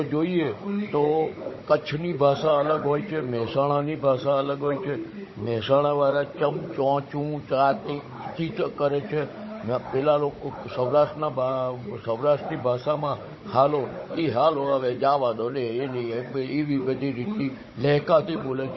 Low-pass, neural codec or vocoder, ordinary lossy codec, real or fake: 7.2 kHz; codec, 16 kHz, 16 kbps, FunCodec, trained on Chinese and English, 50 frames a second; MP3, 24 kbps; fake